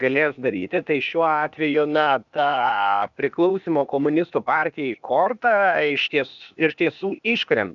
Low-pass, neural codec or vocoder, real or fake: 7.2 kHz; codec, 16 kHz, 0.8 kbps, ZipCodec; fake